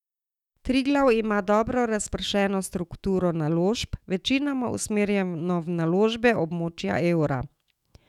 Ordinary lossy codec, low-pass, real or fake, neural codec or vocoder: none; 19.8 kHz; fake; autoencoder, 48 kHz, 128 numbers a frame, DAC-VAE, trained on Japanese speech